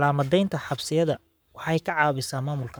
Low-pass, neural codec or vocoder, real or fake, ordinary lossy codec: none; codec, 44.1 kHz, 7.8 kbps, Pupu-Codec; fake; none